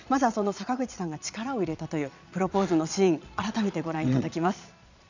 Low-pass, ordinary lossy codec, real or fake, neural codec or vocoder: 7.2 kHz; none; fake; vocoder, 22.05 kHz, 80 mel bands, Vocos